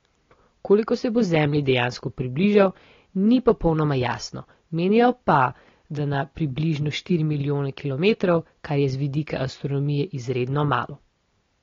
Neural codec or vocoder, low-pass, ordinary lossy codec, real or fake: none; 7.2 kHz; AAC, 32 kbps; real